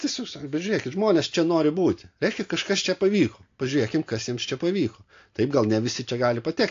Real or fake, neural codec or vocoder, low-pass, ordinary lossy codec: real; none; 7.2 kHz; AAC, 48 kbps